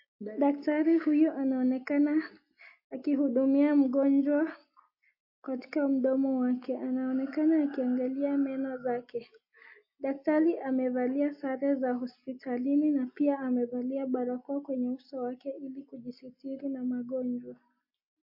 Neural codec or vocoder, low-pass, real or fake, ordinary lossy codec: none; 5.4 kHz; real; MP3, 32 kbps